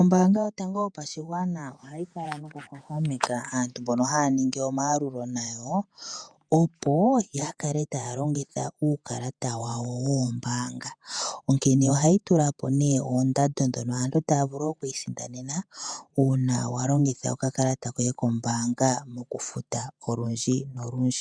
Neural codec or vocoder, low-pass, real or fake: vocoder, 48 kHz, 128 mel bands, Vocos; 9.9 kHz; fake